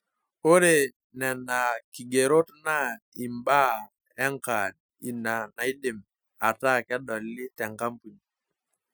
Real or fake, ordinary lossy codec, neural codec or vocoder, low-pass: real; none; none; none